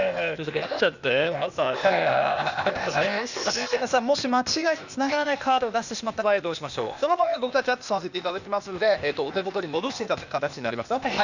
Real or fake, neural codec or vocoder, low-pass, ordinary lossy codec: fake; codec, 16 kHz, 0.8 kbps, ZipCodec; 7.2 kHz; none